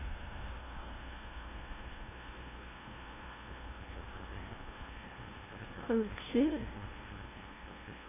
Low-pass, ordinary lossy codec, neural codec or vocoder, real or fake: 3.6 kHz; AAC, 16 kbps; codec, 16 kHz, 0.5 kbps, FunCodec, trained on LibriTTS, 25 frames a second; fake